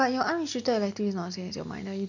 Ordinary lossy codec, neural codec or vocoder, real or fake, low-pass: none; none; real; 7.2 kHz